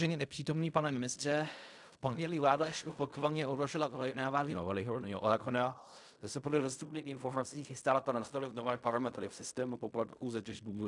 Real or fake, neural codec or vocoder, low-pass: fake; codec, 16 kHz in and 24 kHz out, 0.4 kbps, LongCat-Audio-Codec, fine tuned four codebook decoder; 10.8 kHz